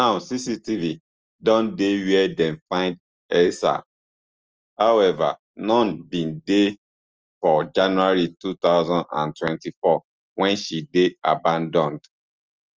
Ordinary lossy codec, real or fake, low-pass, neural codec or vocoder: Opus, 24 kbps; real; 7.2 kHz; none